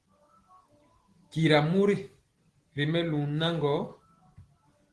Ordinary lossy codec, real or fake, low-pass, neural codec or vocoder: Opus, 16 kbps; real; 10.8 kHz; none